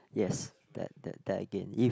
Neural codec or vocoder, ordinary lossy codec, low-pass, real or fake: none; none; none; real